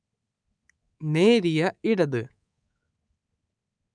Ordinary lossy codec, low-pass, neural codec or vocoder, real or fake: none; 9.9 kHz; codec, 24 kHz, 3.1 kbps, DualCodec; fake